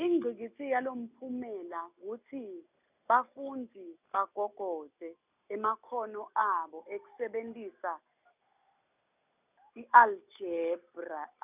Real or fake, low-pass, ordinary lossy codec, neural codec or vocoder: real; 3.6 kHz; none; none